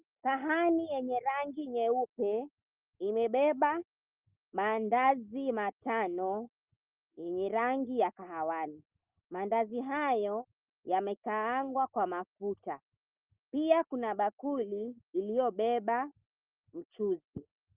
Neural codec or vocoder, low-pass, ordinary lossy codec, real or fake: none; 3.6 kHz; Opus, 32 kbps; real